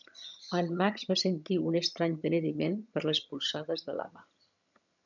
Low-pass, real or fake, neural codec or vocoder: 7.2 kHz; fake; vocoder, 22.05 kHz, 80 mel bands, HiFi-GAN